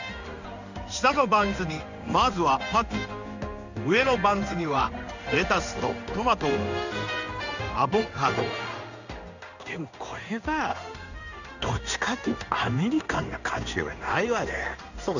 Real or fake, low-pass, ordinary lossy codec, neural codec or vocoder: fake; 7.2 kHz; none; codec, 16 kHz in and 24 kHz out, 1 kbps, XY-Tokenizer